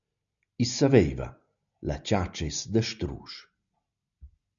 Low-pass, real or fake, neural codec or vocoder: 7.2 kHz; real; none